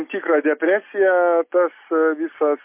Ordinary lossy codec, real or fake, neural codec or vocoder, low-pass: MP3, 24 kbps; real; none; 3.6 kHz